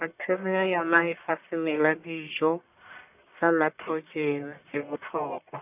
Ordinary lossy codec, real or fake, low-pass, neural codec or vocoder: none; fake; 3.6 kHz; codec, 44.1 kHz, 1.7 kbps, Pupu-Codec